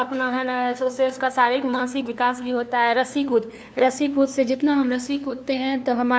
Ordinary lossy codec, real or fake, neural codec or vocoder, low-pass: none; fake; codec, 16 kHz, 2 kbps, FunCodec, trained on LibriTTS, 25 frames a second; none